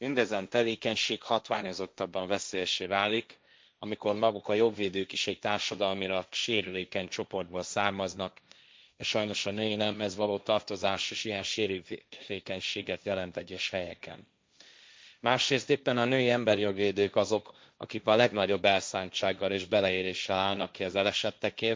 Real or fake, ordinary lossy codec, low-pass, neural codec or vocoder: fake; none; none; codec, 16 kHz, 1.1 kbps, Voila-Tokenizer